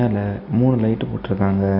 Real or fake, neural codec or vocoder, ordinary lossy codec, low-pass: real; none; none; 5.4 kHz